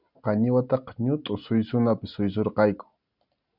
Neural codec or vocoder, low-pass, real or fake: none; 5.4 kHz; real